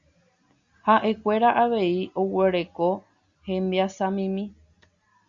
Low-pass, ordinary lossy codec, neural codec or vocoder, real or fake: 7.2 kHz; Opus, 64 kbps; none; real